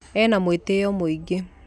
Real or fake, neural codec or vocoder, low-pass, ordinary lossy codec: real; none; none; none